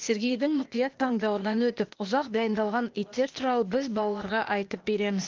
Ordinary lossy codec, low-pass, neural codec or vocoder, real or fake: Opus, 24 kbps; 7.2 kHz; codec, 16 kHz, 0.8 kbps, ZipCodec; fake